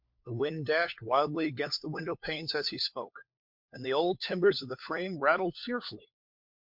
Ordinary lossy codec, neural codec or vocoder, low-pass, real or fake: MP3, 48 kbps; codec, 16 kHz, 4 kbps, FunCodec, trained on LibriTTS, 50 frames a second; 5.4 kHz; fake